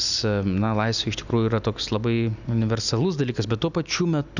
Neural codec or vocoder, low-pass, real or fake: none; 7.2 kHz; real